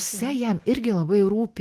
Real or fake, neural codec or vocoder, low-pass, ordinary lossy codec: fake; autoencoder, 48 kHz, 128 numbers a frame, DAC-VAE, trained on Japanese speech; 14.4 kHz; Opus, 16 kbps